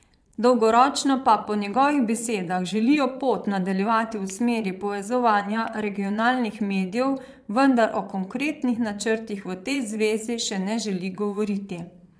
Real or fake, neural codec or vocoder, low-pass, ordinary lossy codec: fake; vocoder, 22.05 kHz, 80 mel bands, Vocos; none; none